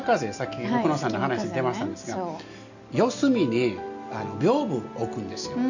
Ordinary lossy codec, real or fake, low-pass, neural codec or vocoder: none; real; 7.2 kHz; none